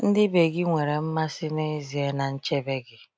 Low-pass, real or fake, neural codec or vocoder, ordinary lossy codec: none; real; none; none